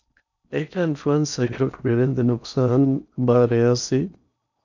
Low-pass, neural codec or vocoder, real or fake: 7.2 kHz; codec, 16 kHz in and 24 kHz out, 0.6 kbps, FocalCodec, streaming, 4096 codes; fake